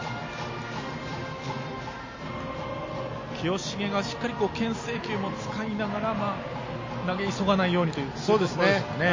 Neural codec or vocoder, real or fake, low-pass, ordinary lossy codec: none; real; 7.2 kHz; MP3, 32 kbps